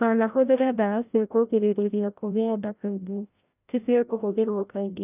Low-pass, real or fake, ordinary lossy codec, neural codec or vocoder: 3.6 kHz; fake; none; codec, 16 kHz, 0.5 kbps, FreqCodec, larger model